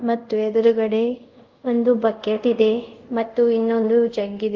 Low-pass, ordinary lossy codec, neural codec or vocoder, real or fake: 7.2 kHz; Opus, 32 kbps; codec, 24 kHz, 0.5 kbps, DualCodec; fake